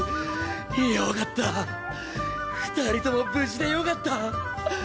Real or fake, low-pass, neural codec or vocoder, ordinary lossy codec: real; none; none; none